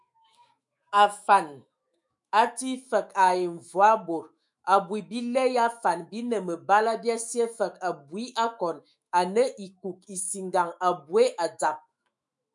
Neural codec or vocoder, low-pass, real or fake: autoencoder, 48 kHz, 128 numbers a frame, DAC-VAE, trained on Japanese speech; 10.8 kHz; fake